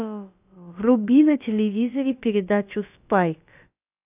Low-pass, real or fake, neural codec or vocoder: 3.6 kHz; fake; codec, 16 kHz, about 1 kbps, DyCAST, with the encoder's durations